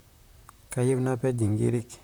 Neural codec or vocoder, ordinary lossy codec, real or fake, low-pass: vocoder, 44.1 kHz, 128 mel bands, Pupu-Vocoder; none; fake; none